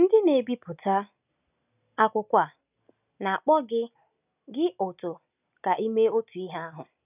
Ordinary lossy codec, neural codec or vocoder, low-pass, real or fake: none; none; 3.6 kHz; real